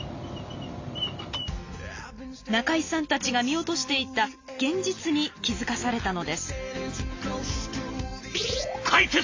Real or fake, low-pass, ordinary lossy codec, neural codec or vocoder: real; 7.2 kHz; AAC, 48 kbps; none